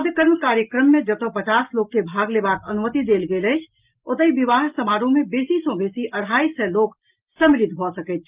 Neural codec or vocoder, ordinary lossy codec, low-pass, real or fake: none; Opus, 32 kbps; 3.6 kHz; real